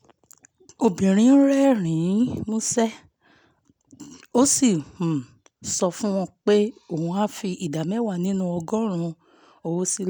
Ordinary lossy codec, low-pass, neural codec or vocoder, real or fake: none; none; none; real